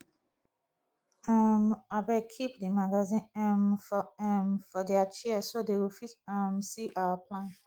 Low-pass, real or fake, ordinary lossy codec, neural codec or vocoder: 19.8 kHz; fake; Opus, 24 kbps; autoencoder, 48 kHz, 128 numbers a frame, DAC-VAE, trained on Japanese speech